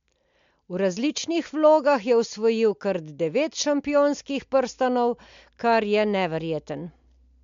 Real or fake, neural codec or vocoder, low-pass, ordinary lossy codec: real; none; 7.2 kHz; MP3, 64 kbps